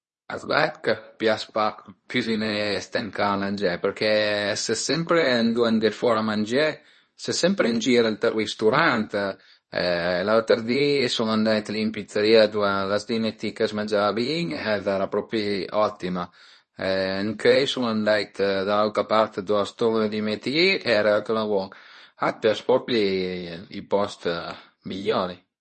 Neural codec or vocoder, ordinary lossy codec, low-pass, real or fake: codec, 24 kHz, 0.9 kbps, WavTokenizer, medium speech release version 2; MP3, 32 kbps; 10.8 kHz; fake